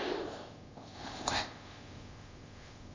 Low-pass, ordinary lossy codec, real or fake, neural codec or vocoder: 7.2 kHz; AAC, 32 kbps; fake; codec, 24 kHz, 0.5 kbps, DualCodec